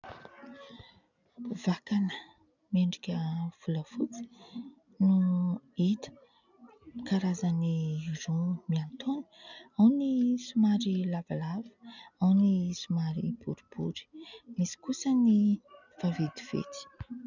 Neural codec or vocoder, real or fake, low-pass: none; real; 7.2 kHz